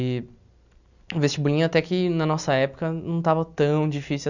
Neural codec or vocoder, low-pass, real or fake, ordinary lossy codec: none; 7.2 kHz; real; none